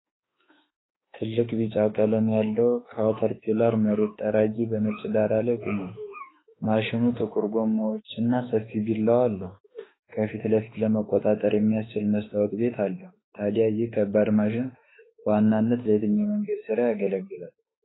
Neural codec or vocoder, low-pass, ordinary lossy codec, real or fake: autoencoder, 48 kHz, 32 numbers a frame, DAC-VAE, trained on Japanese speech; 7.2 kHz; AAC, 16 kbps; fake